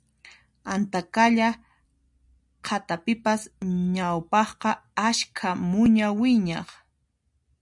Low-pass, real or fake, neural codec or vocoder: 10.8 kHz; real; none